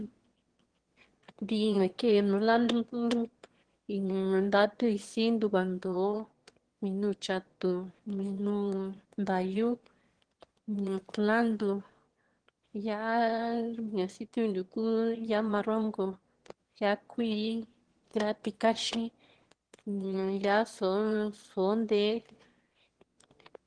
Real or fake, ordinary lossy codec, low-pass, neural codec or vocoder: fake; Opus, 16 kbps; 9.9 kHz; autoencoder, 22.05 kHz, a latent of 192 numbers a frame, VITS, trained on one speaker